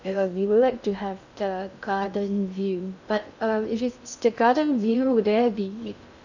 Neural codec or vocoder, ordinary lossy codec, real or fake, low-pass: codec, 16 kHz in and 24 kHz out, 0.6 kbps, FocalCodec, streaming, 2048 codes; none; fake; 7.2 kHz